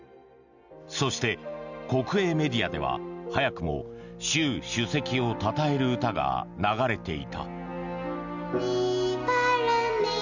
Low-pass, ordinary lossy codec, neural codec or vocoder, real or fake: 7.2 kHz; none; none; real